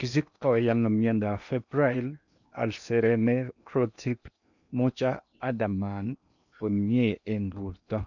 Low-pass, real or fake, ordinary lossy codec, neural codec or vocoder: 7.2 kHz; fake; none; codec, 16 kHz in and 24 kHz out, 0.8 kbps, FocalCodec, streaming, 65536 codes